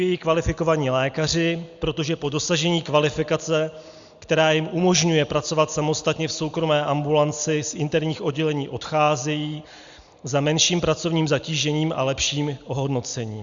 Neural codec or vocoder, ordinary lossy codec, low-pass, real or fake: none; Opus, 64 kbps; 7.2 kHz; real